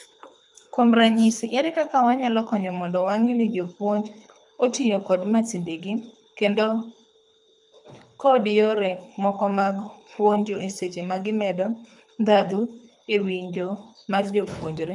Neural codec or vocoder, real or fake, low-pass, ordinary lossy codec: codec, 24 kHz, 3 kbps, HILCodec; fake; 10.8 kHz; none